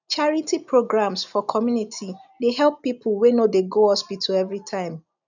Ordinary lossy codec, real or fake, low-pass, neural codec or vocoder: none; real; 7.2 kHz; none